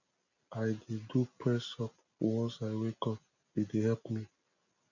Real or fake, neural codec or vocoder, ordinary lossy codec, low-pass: real; none; none; 7.2 kHz